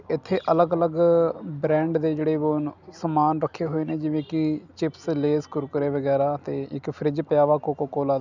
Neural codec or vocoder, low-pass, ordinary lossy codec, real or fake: none; 7.2 kHz; none; real